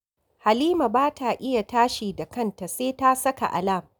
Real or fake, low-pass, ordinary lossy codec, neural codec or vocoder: real; none; none; none